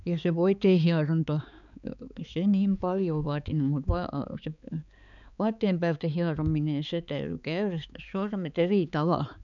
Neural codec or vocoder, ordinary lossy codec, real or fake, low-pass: codec, 16 kHz, 4 kbps, X-Codec, HuBERT features, trained on balanced general audio; none; fake; 7.2 kHz